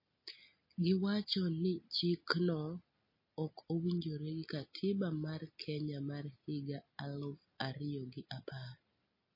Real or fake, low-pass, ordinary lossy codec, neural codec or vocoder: real; 5.4 kHz; MP3, 24 kbps; none